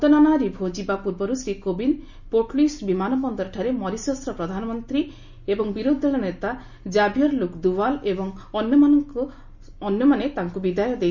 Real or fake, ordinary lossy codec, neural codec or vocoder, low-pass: real; none; none; 7.2 kHz